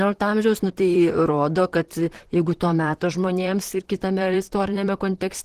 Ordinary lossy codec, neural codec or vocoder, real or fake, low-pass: Opus, 16 kbps; vocoder, 44.1 kHz, 128 mel bands, Pupu-Vocoder; fake; 14.4 kHz